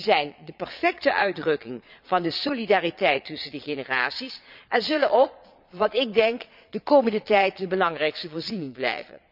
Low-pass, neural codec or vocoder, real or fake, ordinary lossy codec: 5.4 kHz; vocoder, 22.05 kHz, 80 mel bands, Vocos; fake; none